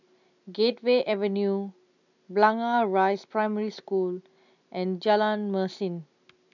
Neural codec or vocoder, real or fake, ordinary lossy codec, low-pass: none; real; none; 7.2 kHz